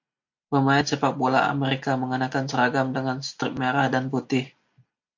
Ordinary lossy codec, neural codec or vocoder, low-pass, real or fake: MP3, 48 kbps; none; 7.2 kHz; real